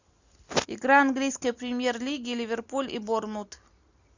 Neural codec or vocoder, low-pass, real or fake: none; 7.2 kHz; real